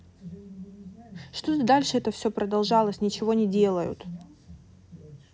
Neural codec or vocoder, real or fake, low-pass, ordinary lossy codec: none; real; none; none